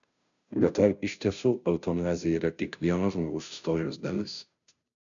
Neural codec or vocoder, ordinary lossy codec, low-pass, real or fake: codec, 16 kHz, 0.5 kbps, FunCodec, trained on Chinese and English, 25 frames a second; MP3, 96 kbps; 7.2 kHz; fake